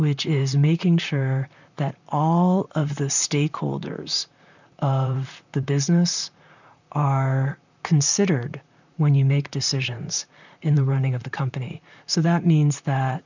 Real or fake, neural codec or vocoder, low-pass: fake; vocoder, 44.1 kHz, 128 mel bands, Pupu-Vocoder; 7.2 kHz